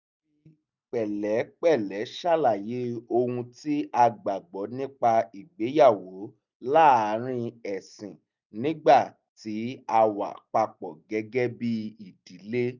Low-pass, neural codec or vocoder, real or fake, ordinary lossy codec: 7.2 kHz; none; real; none